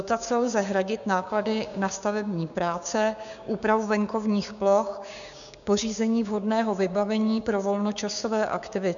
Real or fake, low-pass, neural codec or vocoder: fake; 7.2 kHz; codec, 16 kHz, 6 kbps, DAC